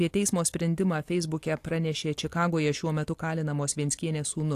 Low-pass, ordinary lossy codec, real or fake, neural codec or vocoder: 14.4 kHz; AAC, 64 kbps; real; none